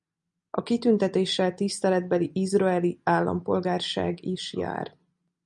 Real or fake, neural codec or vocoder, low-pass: real; none; 10.8 kHz